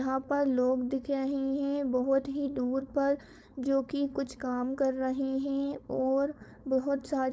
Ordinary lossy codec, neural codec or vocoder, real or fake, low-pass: none; codec, 16 kHz, 4.8 kbps, FACodec; fake; none